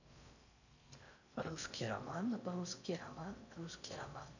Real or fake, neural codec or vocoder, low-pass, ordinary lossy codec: fake; codec, 16 kHz in and 24 kHz out, 0.6 kbps, FocalCodec, streaming, 4096 codes; 7.2 kHz; none